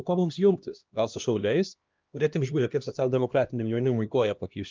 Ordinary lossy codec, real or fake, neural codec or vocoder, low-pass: Opus, 24 kbps; fake; codec, 16 kHz, 1 kbps, X-Codec, HuBERT features, trained on LibriSpeech; 7.2 kHz